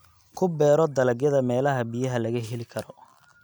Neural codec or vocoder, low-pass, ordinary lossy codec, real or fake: none; none; none; real